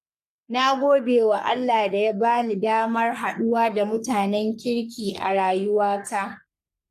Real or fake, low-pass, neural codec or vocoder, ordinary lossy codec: fake; 14.4 kHz; codec, 44.1 kHz, 3.4 kbps, Pupu-Codec; AAC, 64 kbps